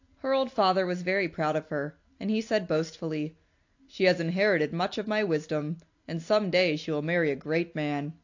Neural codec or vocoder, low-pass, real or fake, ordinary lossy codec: none; 7.2 kHz; real; AAC, 48 kbps